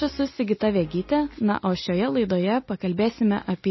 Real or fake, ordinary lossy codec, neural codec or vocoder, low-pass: real; MP3, 24 kbps; none; 7.2 kHz